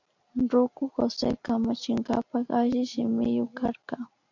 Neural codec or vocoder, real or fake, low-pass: none; real; 7.2 kHz